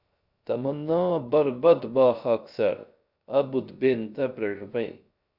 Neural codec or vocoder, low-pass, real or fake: codec, 16 kHz, 0.3 kbps, FocalCodec; 5.4 kHz; fake